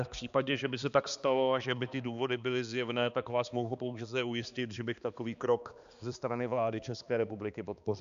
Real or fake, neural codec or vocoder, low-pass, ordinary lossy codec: fake; codec, 16 kHz, 2 kbps, X-Codec, HuBERT features, trained on balanced general audio; 7.2 kHz; AAC, 96 kbps